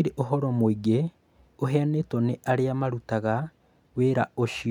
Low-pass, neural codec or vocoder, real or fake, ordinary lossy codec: 19.8 kHz; none; real; none